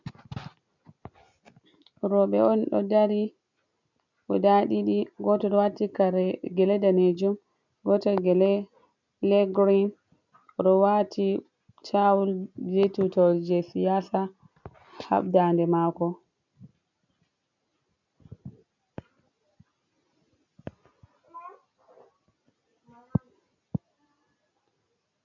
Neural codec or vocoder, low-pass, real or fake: none; 7.2 kHz; real